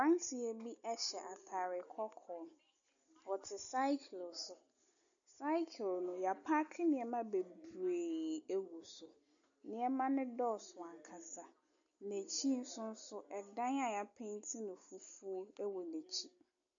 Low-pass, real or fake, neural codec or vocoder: 7.2 kHz; real; none